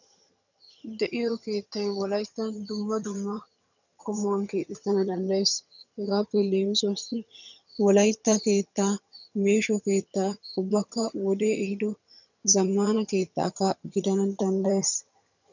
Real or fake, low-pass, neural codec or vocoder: fake; 7.2 kHz; vocoder, 22.05 kHz, 80 mel bands, HiFi-GAN